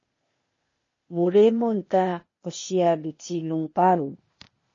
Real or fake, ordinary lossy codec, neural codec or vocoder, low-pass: fake; MP3, 32 kbps; codec, 16 kHz, 0.8 kbps, ZipCodec; 7.2 kHz